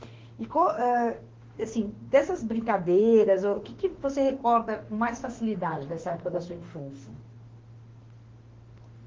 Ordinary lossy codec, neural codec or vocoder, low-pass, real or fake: Opus, 16 kbps; autoencoder, 48 kHz, 32 numbers a frame, DAC-VAE, trained on Japanese speech; 7.2 kHz; fake